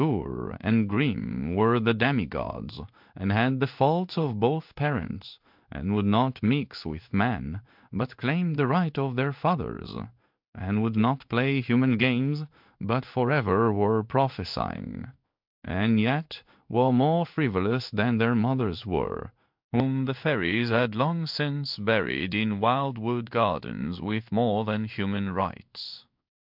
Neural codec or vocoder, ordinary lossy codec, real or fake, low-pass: codec, 16 kHz in and 24 kHz out, 1 kbps, XY-Tokenizer; MP3, 48 kbps; fake; 5.4 kHz